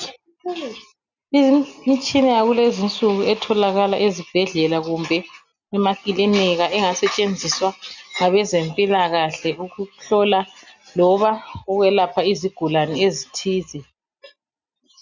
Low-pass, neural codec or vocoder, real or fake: 7.2 kHz; none; real